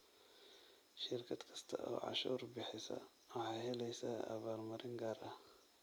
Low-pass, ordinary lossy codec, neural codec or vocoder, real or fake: none; none; none; real